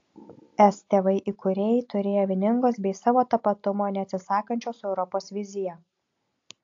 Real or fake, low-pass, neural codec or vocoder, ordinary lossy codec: real; 7.2 kHz; none; AAC, 48 kbps